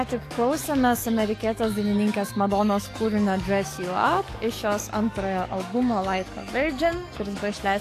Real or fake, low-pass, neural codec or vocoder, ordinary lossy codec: fake; 14.4 kHz; codec, 44.1 kHz, 7.8 kbps, DAC; AAC, 64 kbps